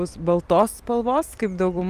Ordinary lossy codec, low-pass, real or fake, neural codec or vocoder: Opus, 32 kbps; 14.4 kHz; fake; vocoder, 44.1 kHz, 128 mel bands every 256 samples, BigVGAN v2